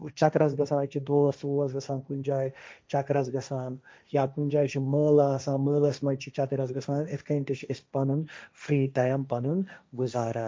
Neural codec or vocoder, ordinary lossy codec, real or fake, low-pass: codec, 16 kHz, 1.1 kbps, Voila-Tokenizer; none; fake; none